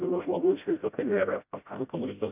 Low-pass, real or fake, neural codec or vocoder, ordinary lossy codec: 3.6 kHz; fake; codec, 16 kHz, 0.5 kbps, FreqCodec, smaller model; AAC, 32 kbps